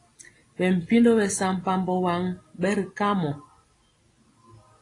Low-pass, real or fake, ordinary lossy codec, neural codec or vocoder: 10.8 kHz; real; AAC, 32 kbps; none